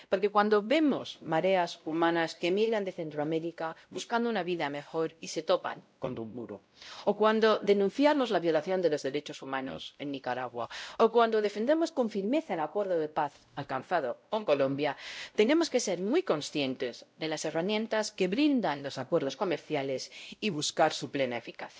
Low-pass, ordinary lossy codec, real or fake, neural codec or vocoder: none; none; fake; codec, 16 kHz, 0.5 kbps, X-Codec, WavLM features, trained on Multilingual LibriSpeech